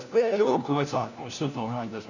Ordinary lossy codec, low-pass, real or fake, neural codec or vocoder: none; 7.2 kHz; fake; codec, 16 kHz, 1 kbps, FunCodec, trained on LibriTTS, 50 frames a second